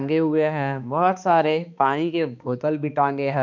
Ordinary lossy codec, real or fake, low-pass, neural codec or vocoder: none; fake; 7.2 kHz; codec, 16 kHz, 2 kbps, X-Codec, HuBERT features, trained on balanced general audio